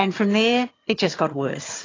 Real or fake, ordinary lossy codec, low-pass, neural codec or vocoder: fake; AAC, 32 kbps; 7.2 kHz; vocoder, 22.05 kHz, 80 mel bands, HiFi-GAN